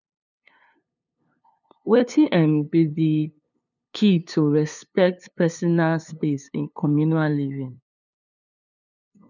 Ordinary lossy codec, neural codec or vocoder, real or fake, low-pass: none; codec, 16 kHz, 2 kbps, FunCodec, trained on LibriTTS, 25 frames a second; fake; 7.2 kHz